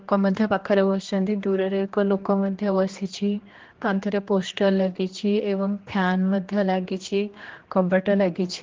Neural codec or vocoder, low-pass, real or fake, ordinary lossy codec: codec, 16 kHz, 2 kbps, X-Codec, HuBERT features, trained on general audio; 7.2 kHz; fake; Opus, 16 kbps